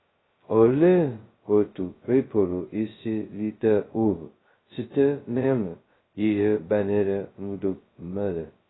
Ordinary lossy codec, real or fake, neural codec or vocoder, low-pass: AAC, 16 kbps; fake; codec, 16 kHz, 0.2 kbps, FocalCodec; 7.2 kHz